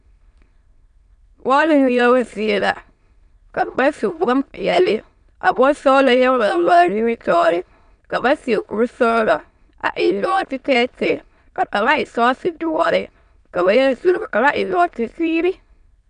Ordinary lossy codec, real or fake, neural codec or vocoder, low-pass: MP3, 96 kbps; fake; autoencoder, 22.05 kHz, a latent of 192 numbers a frame, VITS, trained on many speakers; 9.9 kHz